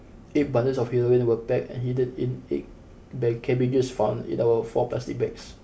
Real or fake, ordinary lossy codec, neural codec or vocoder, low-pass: real; none; none; none